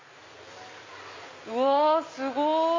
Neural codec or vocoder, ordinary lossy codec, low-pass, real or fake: none; MP3, 32 kbps; 7.2 kHz; real